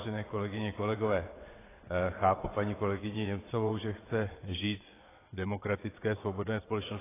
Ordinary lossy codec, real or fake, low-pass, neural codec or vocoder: AAC, 16 kbps; fake; 3.6 kHz; vocoder, 22.05 kHz, 80 mel bands, WaveNeXt